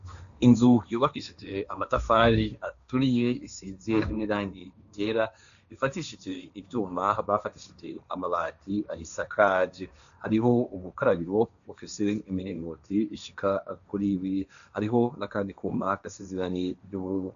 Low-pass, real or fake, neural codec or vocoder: 7.2 kHz; fake; codec, 16 kHz, 1.1 kbps, Voila-Tokenizer